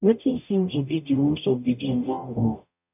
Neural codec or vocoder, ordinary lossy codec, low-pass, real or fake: codec, 44.1 kHz, 0.9 kbps, DAC; none; 3.6 kHz; fake